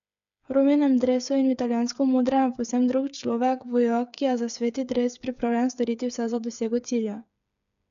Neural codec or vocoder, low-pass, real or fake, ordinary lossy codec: codec, 16 kHz, 8 kbps, FreqCodec, smaller model; 7.2 kHz; fake; none